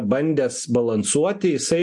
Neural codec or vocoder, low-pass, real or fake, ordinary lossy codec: none; 10.8 kHz; real; MP3, 64 kbps